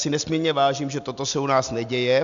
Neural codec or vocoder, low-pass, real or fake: none; 7.2 kHz; real